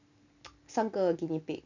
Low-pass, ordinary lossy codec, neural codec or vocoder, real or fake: 7.2 kHz; none; none; real